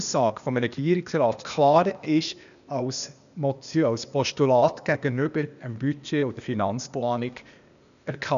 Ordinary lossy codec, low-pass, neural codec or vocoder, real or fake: none; 7.2 kHz; codec, 16 kHz, 0.8 kbps, ZipCodec; fake